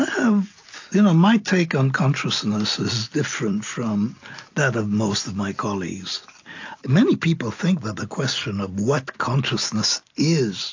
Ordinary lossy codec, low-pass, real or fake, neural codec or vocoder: AAC, 48 kbps; 7.2 kHz; real; none